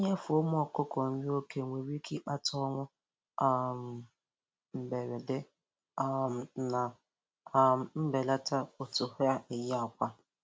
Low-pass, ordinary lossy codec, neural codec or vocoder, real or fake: none; none; none; real